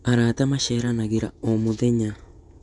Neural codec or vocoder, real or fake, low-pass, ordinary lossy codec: vocoder, 48 kHz, 128 mel bands, Vocos; fake; 10.8 kHz; none